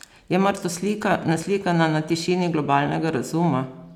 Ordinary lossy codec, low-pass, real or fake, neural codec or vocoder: none; 19.8 kHz; real; none